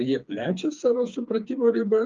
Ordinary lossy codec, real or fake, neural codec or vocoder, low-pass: Opus, 32 kbps; fake; codec, 16 kHz, 4 kbps, FreqCodec, smaller model; 7.2 kHz